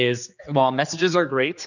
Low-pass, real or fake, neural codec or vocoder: 7.2 kHz; fake; codec, 16 kHz, 2 kbps, X-Codec, HuBERT features, trained on general audio